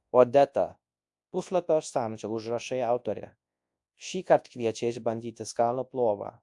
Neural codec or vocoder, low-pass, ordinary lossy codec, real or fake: codec, 24 kHz, 0.9 kbps, WavTokenizer, large speech release; 10.8 kHz; AAC, 64 kbps; fake